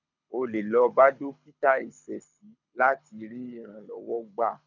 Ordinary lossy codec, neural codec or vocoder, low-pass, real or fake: none; codec, 24 kHz, 6 kbps, HILCodec; 7.2 kHz; fake